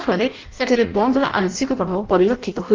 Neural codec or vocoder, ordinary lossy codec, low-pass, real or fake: codec, 16 kHz in and 24 kHz out, 0.6 kbps, FireRedTTS-2 codec; Opus, 32 kbps; 7.2 kHz; fake